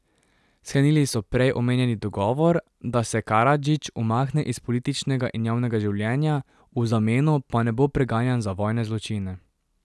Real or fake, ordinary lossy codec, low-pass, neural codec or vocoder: real; none; none; none